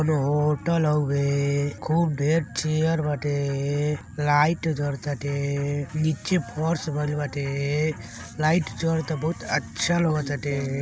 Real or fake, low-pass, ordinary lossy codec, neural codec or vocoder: real; none; none; none